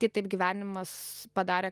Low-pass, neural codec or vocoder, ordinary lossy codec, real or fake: 14.4 kHz; none; Opus, 32 kbps; real